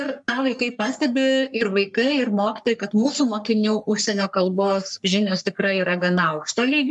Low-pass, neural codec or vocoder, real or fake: 10.8 kHz; codec, 44.1 kHz, 3.4 kbps, Pupu-Codec; fake